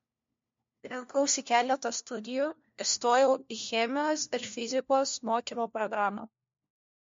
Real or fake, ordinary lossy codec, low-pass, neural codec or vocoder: fake; MP3, 48 kbps; 7.2 kHz; codec, 16 kHz, 1 kbps, FunCodec, trained on LibriTTS, 50 frames a second